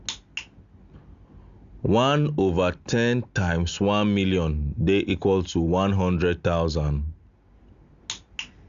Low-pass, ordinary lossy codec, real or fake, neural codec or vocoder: 7.2 kHz; Opus, 64 kbps; real; none